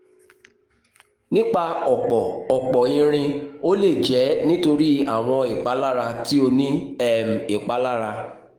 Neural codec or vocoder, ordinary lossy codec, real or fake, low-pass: codec, 44.1 kHz, 7.8 kbps, DAC; Opus, 24 kbps; fake; 19.8 kHz